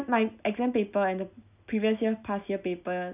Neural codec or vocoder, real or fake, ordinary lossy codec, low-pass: none; real; none; 3.6 kHz